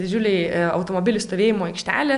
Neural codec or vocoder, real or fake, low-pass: none; real; 10.8 kHz